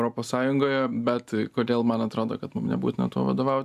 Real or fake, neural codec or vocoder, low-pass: real; none; 14.4 kHz